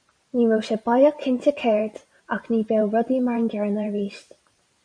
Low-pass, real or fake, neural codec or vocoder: 9.9 kHz; fake; vocoder, 44.1 kHz, 128 mel bands every 512 samples, BigVGAN v2